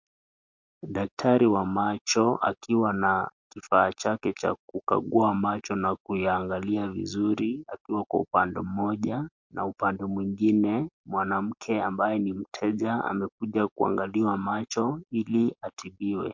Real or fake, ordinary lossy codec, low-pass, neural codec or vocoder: real; MP3, 48 kbps; 7.2 kHz; none